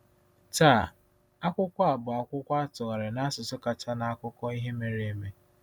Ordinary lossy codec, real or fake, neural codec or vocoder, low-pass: none; real; none; none